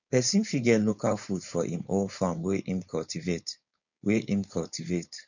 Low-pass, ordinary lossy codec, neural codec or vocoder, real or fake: 7.2 kHz; none; codec, 16 kHz, 4.8 kbps, FACodec; fake